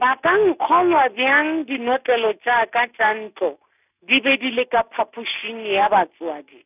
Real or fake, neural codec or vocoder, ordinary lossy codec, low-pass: real; none; none; 3.6 kHz